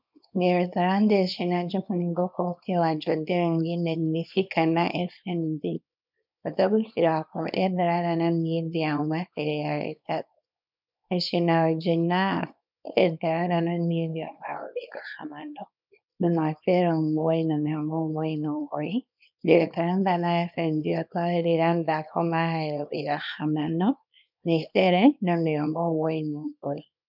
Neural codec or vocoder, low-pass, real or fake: codec, 24 kHz, 0.9 kbps, WavTokenizer, small release; 5.4 kHz; fake